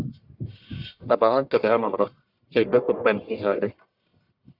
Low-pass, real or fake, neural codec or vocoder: 5.4 kHz; fake; codec, 44.1 kHz, 1.7 kbps, Pupu-Codec